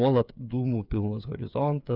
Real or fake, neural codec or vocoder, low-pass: fake; codec, 16 kHz, 8 kbps, FreqCodec, smaller model; 5.4 kHz